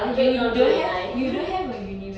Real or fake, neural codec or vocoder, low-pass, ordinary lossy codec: real; none; none; none